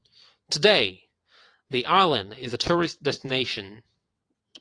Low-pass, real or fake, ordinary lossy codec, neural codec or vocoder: 9.9 kHz; fake; AAC, 48 kbps; vocoder, 22.05 kHz, 80 mel bands, WaveNeXt